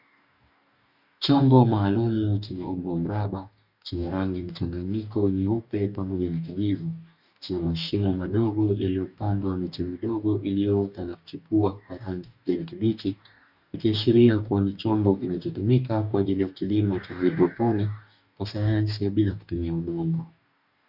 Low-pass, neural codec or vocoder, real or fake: 5.4 kHz; codec, 44.1 kHz, 2.6 kbps, DAC; fake